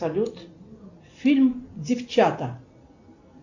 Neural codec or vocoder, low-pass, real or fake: none; 7.2 kHz; real